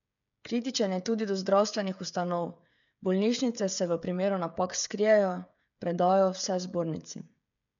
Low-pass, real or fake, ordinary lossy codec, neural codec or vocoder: 7.2 kHz; fake; none; codec, 16 kHz, 16 kbps, FreqCodec, smaller model